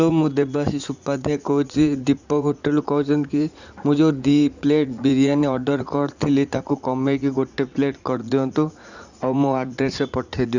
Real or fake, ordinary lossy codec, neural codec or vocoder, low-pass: fake; Opus, 64 kbps; vocoder, 44.1 kHz, 80 mel bands, Vocos; 7.2 kHz